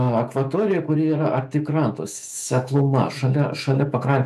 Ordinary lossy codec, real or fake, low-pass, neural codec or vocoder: AAC, 96 kbps; fake; 14.4 kHz; autoencoder, 48 kHz, 128 numbers a frame, DAC-VAE, trained on Japanese speech